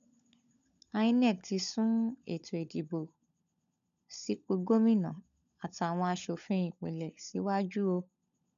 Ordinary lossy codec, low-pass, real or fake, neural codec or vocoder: none; 7.2 kHz; fake; codec, 16 kHz, 16 kbps, FunCodec, trained on LibriTTS, 50 frames a second